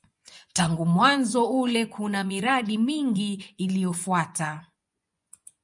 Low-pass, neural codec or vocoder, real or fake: 10.8 kHz; vocoder, 24 kHz, 100 mel bands, Vocos; fake